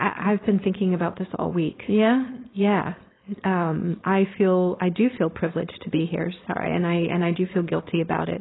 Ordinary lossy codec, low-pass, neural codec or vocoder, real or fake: AAC, 16 kbps; 7.2 kHz; codec, 16 kHz, 4.8 kbps, FACodec; fake